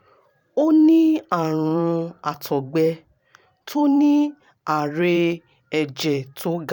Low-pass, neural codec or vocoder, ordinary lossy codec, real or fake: 19.8 kHz; vocoder, 44.1 kHz, 128 mel bands every 512 samples, BigVGAN v2; none; fake